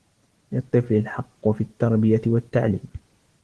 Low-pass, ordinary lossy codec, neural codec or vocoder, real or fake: 10.8 kHz; Opus, 16 kbps; none; real